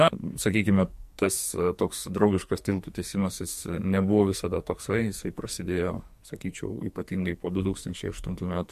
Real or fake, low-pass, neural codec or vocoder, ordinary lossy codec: fake; 14.4 kHz; codec, 44.1 kHz, 2.6 kbps, SNAC; MP3, 64 kbps